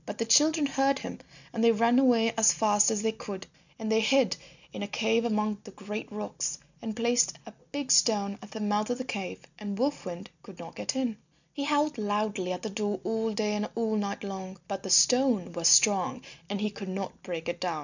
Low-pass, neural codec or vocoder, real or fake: 7.2 kHz; none; real